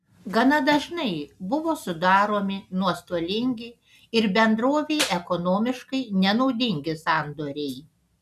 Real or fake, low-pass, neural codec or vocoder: real; 14.4 kHz; none